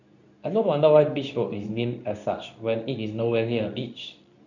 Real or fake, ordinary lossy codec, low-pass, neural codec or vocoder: fake; none; 7.2 kHz; codec, 24 kHz, 0.9 kbps, WavTokenizer, medium speech release version 2